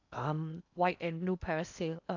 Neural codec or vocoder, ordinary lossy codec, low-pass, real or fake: codec, 16 kHz in and 24 kHz out, 0.8 kbps, FocalCodec, streaming, 65536 codes; none; 7.2 kHz; fake